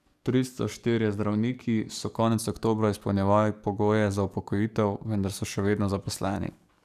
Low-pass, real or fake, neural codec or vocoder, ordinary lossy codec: 14.4 kHz; fake; codec, 44.1 kHz, 7.8 kbps, DAC; none